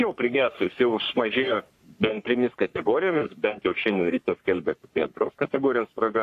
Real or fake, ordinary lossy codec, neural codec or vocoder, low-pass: fake; AAC, 64 kbps; codec, 44.1 kHz, 3.4 kbps, Pupu-Codec; 14.4 kHz